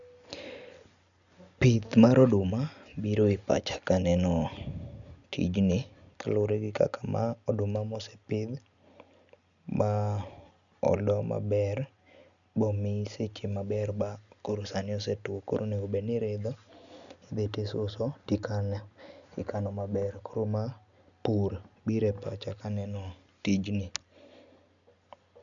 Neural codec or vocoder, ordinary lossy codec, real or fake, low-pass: none; none; real; 7.2 kHz